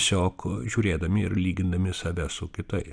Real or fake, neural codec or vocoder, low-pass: real; none; 9.9 kHz